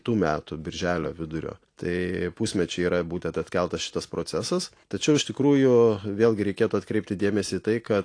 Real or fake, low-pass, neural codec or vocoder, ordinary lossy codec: real; 9.9 kHz; none; AAC, 48 kbps